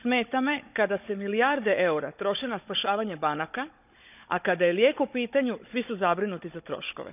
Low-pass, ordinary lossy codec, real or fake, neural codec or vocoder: 3.6 kHz; none; fake; codec, 16 kHz, 16 kbps, FunCodec, trained on Chinese and English, 50 frames a second